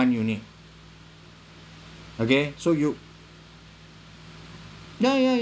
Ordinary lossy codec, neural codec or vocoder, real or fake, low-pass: none; none; real; none